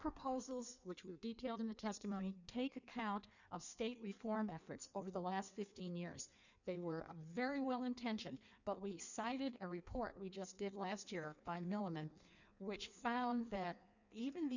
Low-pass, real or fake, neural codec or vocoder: 7.2 kHz; fake; codec, 16 kHz in and 24 kHz out, 1.1 kbps, FireRedTTS-2 codec